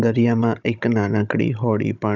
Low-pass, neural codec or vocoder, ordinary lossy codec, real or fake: 7.2 kHz; codec, 16 kHz, 16 kbps, FunCodec, trained on Chinese and English, 50 frames a second; none; fake